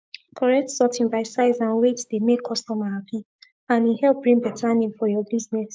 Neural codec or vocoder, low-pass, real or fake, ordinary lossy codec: codec, 16 kHz, 6 kbps, DAC; none; fake; none